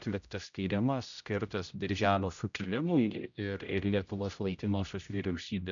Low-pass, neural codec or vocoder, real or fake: 7.2 kHz; codec, 16 kHz, 0.5 kbps, X-Codec, HuBERT features, trained on general audio; fake